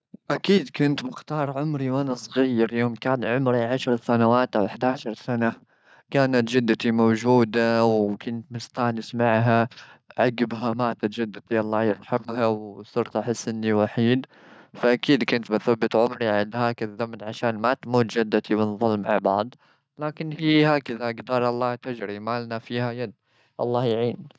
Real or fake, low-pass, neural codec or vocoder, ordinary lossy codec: real; none; none; none